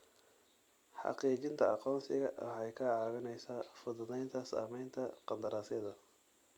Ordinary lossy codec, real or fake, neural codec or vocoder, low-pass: Opus, 64 kbps; fake; vocoder, 44.1 kHz, 128 mel bands every 256 samples, BigVGAN v2; 19.8 kHz